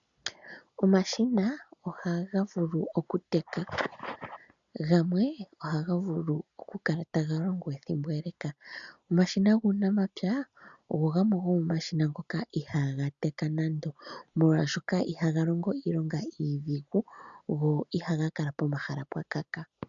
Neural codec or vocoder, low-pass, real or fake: none; 7.2 kHz; real